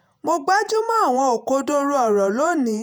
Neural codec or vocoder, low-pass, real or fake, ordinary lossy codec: vocoder, 48 kHz, 128 mel bands, Vocos; none; fake; none